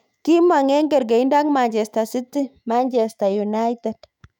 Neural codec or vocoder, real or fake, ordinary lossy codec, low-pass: autoencoder, 48 kHz, 128 numbers a frame, DAC-VAE, trained on Japanese speech; fake; none; 19.8 kHz